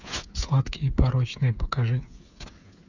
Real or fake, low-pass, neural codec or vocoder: fake; 7.2 kHz; codec, 16 kHz, 8 kbps, FreqCodec, smaller model